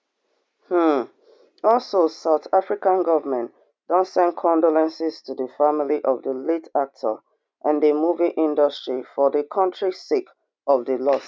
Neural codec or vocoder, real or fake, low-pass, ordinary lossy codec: none; real; none; none